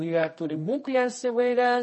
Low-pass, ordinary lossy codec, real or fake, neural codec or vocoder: 10.8 kHz; MP3, 32 kbps; fake; codec, 24 kHz, 0.9 kbps, WavTokenizer, medium music audio release